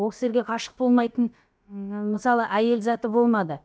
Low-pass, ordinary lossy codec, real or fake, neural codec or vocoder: none; none; fake; codec, 16 kHz, about 1 kbps, DyCAST, with the encoder's durations